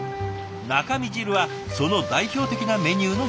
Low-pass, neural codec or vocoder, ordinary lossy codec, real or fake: none; none; none; real